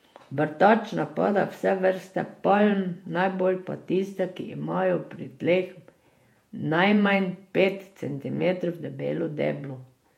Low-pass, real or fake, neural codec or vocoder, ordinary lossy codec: 19.8 kHz; fake; vocoder, 48 kHz, 128 mel bands, Vocos; MP3, 64 kbps